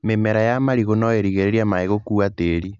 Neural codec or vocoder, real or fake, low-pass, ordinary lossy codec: none; real; 7.2 kHz; none